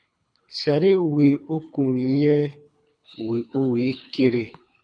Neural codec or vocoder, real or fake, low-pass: codec, 24 kHz, 3 kbps, HILCodec; fake; 9.9 kHz